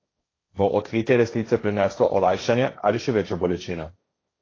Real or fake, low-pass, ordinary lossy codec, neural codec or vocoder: fake; 7.2 kHz; AAC, 32 kbps; codec, 16 kHz, 1.1 kbps, Voila-Tokenizer